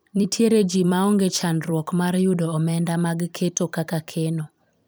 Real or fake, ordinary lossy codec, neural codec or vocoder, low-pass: fake; none; vocoder, 44.1 kHz, 128 mel bands every 256 samples, BigVGAN v2; none